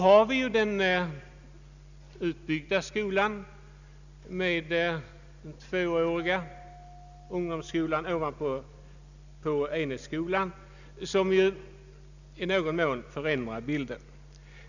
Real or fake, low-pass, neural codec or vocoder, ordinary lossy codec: real; 7.2 kHz; none; none